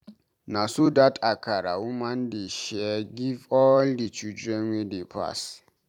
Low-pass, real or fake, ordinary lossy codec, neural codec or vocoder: 19.8 kHz; fake; none; vocoder, 44.1 kHz, 128 mel bands every 256 samples, BigVGAN v2